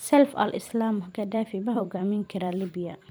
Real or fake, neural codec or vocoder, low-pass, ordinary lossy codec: fake; vocoder, 44.1 kHz, 128 mel bands every 256 samples, BigVGAN v2; none; none